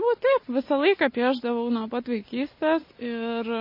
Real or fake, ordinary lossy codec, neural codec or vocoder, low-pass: real; MP3, 24 kbps; none; 5.4 kHz